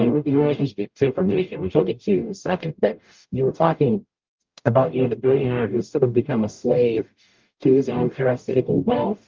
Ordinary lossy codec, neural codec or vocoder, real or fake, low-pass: Opus, 32 kbps; codec, 44.1 kHz, 0.9 kbps, DAC; fake; 7.2 kHz